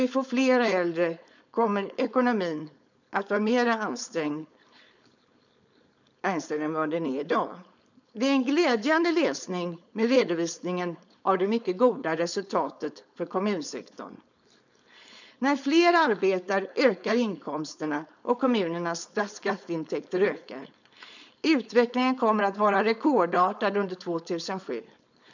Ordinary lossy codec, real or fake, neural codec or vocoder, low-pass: none; fake; codec, 16 kHz, 4.8 kbps, FACodec; 7.2 kHz